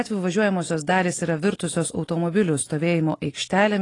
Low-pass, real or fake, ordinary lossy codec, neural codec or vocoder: 10.8 kHz; real; AAC, 32 kbps; none